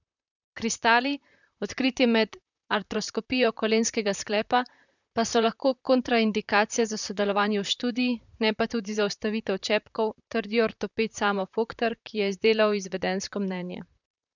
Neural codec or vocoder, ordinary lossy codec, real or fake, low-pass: vocoder, 44.1 kHz, 128 mel bands, Pupu-Vocoder; none; fake; 7.2 kHz